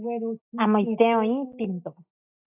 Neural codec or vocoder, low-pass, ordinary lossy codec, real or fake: none; 3.6 kHz; AAC, 32 kbps; real